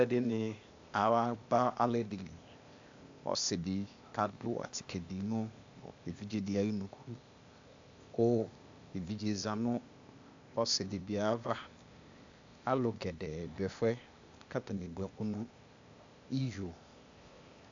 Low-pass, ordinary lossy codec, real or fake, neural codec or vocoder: 7.2 kHz; AAC, 64 kbps; fake; codec, 16 kHz, 0.8 kbps, ZipCodec